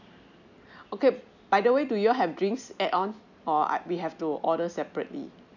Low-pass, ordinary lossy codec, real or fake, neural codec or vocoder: 7.2 kHz; none; real; none